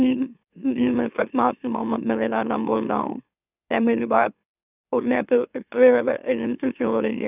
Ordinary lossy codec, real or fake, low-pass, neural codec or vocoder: none; fake; 3.6 kHz; autoencoder, 44.1 kHz, a latent of 192 numbers a frame, MeloTTS